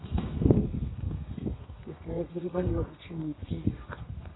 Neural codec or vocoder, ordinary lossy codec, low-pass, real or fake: codec, 32 kHz, 1.9 kbps, SNAC; AAC, 16 kbps; 7.2 kHz; fake